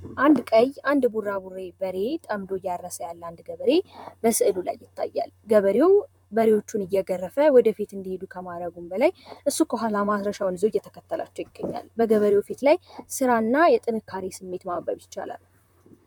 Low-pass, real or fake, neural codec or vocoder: 19.8 kHz; fake; vocoder, 44.1 kHz, 128 mel bands, Pupu-Vocoder